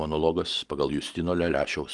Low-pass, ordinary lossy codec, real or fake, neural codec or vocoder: 10.8 kHz; Opus, 24 kbps; real; none